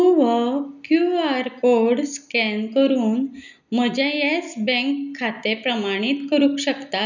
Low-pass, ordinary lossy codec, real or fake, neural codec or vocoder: 7.2 kHz; none; real; none